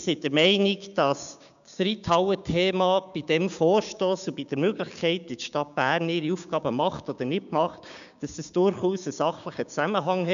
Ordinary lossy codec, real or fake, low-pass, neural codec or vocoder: MP3, 96 kbps; fake; 7.2 kHz; codec, 16 kHz, 6 kbps, DAC